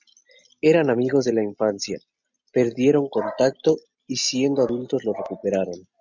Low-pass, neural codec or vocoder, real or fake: 7.2 kHz; none; real